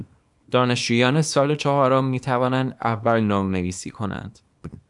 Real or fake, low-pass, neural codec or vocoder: fake; 10.8 kHz; codec, 24 kHz, 0.9 kbps, WavTokenizer, small release